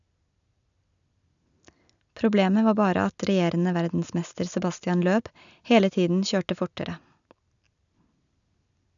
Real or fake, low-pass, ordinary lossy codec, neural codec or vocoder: real; 7.2 kHz; none; none